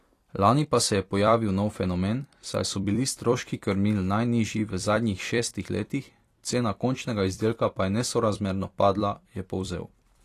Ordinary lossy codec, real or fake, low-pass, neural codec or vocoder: AAC, 48 kbps; fake; 14.4 kHz; vocoder, 44.1 kHz, 128 mel bands every 256 samples, BigVGAN v2